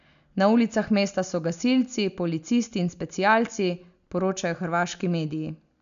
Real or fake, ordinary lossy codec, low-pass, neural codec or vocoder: real; none; 7.2 kHz; none